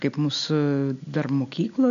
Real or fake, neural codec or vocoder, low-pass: real; none; 7.2 kHz